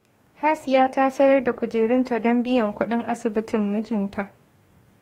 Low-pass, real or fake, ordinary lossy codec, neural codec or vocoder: 19.8 kHz; fake; AAC, 48 kbps; codec, 44.1 kHz, 2.6 kbps, DAC